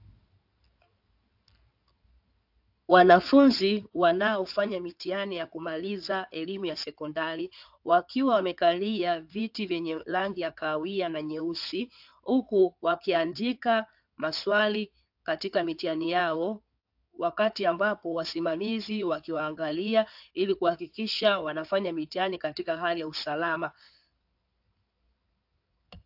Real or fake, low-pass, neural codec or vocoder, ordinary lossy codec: fake; 5.4 kHz; codec, 16 kHz in and 24 kHz out, 2.2 kbps, FireRedTTS-2 codec; AAC, 48 kbps